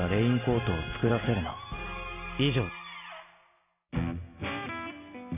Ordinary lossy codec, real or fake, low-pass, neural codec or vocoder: none; real; 3.6 kHz; none